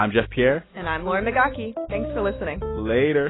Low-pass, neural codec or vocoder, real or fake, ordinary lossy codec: 7.2 kHz; none; real; AAC, 16 kbps